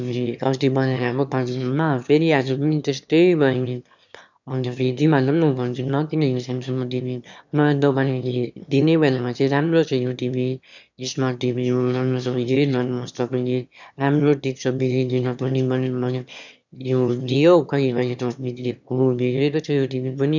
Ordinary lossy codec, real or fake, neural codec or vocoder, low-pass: none; fake; autoencoder, 22.05 kHz, a latent of 192 numbers a frame, VITS, trained on one speaker; 7.2 kHz